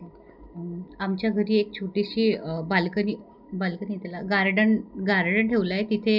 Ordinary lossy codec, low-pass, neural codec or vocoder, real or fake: none; 5.4 kHz; none; real